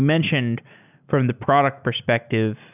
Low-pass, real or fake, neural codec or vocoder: 3.6 kHz; real; none